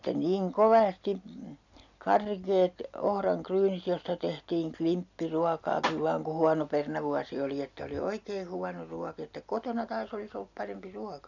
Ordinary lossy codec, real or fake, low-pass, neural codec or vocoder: none; real; 7.2 kHz; none